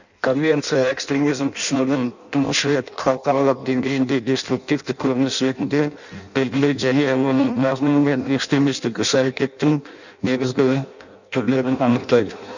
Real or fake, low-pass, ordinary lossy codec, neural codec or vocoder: fake; 7.2 kHz; none; codec, 16 kHz in and 24 kHz out, 0.6 kbps, FireRedTTS-2 codec